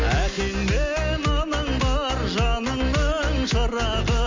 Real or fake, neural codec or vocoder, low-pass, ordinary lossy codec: real; none; 7.2 kHz; MP3, 64 kbps